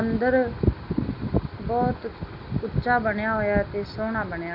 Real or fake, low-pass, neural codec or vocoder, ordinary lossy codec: real; 5.4 kHz; none; none